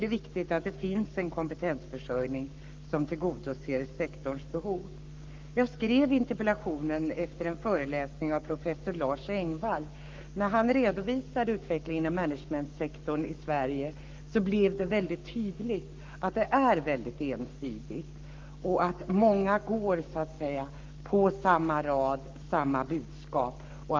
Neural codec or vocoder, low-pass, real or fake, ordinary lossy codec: codec, 44.1 kHz, 7.8 kbps, Pupu-Codec; 7.2 kHz; fake; Opus, 24 kbps